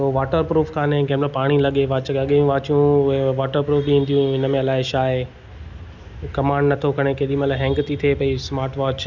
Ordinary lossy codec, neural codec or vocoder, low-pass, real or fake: none; none; 7.2 kHz; real